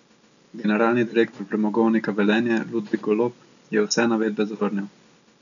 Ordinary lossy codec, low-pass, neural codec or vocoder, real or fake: none; 7.2 kHz; none; real